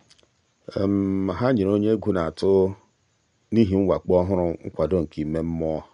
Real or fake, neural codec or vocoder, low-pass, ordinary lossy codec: real; none; 9.9 kHz; none